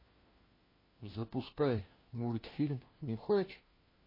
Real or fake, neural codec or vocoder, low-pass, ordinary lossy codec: fake; codec, 16 kHz, 1 kbps, FunCodec, trained on LibriTTS, 50 frames a second; 5.4 kHz; MP3, 24 kbps